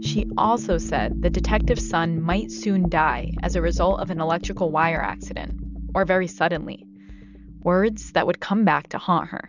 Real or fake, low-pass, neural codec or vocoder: real; 7.2 kHz; none